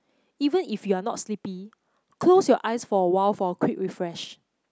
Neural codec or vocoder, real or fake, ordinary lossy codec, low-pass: none; real; none; none